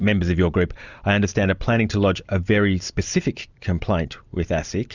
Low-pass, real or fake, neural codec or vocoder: 7.2 kHz; fake; vocoder, 44.1 kHz, 128 mel bands every 256 samples, BigVGAN v2